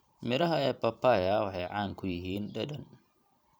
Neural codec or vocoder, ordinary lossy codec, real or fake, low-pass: vocoder, 44.1 kHz, 128 mel bands every 512 samples, BigVGAN v2; none; fake; none